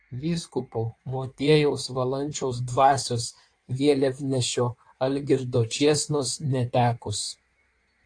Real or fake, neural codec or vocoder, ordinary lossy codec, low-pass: fake; codec, 16 kHz in and 24 kHz out, 1.1 kbps, FireRedTTS-2 codec; AAC, 48 kbps; 9.9 kHz